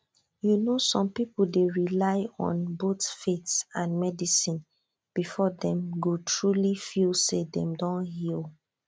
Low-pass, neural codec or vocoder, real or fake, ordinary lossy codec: none; none; real; none